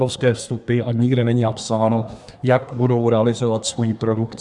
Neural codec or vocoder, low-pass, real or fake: codec, 24 kHz, 1 kbps, SNAC; 10.8 kHz; fake